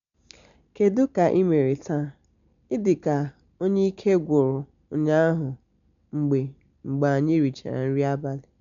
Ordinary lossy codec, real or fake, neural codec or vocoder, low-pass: none; real; none; 7.2 kHz